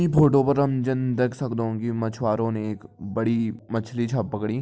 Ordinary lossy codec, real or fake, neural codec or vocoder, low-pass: none; real; none; none